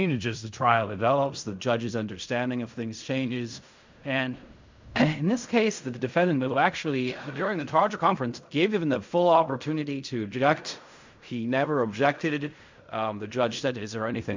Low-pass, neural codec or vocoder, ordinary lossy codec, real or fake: 7.2 kHz; codec, 16 kHz in and 24 kHz out, 0.4 kbps, LongCat-Audio-Codec, fine tuned four codebook decoder; MP3, 64 kbps; fake